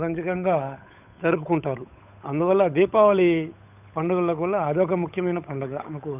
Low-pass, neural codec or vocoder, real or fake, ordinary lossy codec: 3.6 kHz; codec, 16 kHz, 8 kbps, FunCodec, trained on Chinese and English, 25 frames a second; fake; none